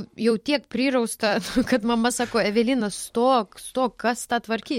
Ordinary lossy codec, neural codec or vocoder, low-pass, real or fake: MP3, 64 kbps; vocoder, 44.1 kHz, 128 mel bands every 256 samples, BigVGAN v2; 19.8 kHz; fake